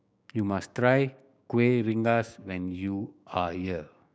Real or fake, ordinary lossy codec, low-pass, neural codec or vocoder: fake; none; none; codec, 16 kHz, 6 kbps, DAC